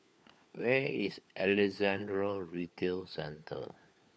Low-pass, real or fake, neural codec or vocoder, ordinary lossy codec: none; fake; codec, 16 kHz, 4 kbps, FunCodec, trained on LibriTTS, 50 frames a second; none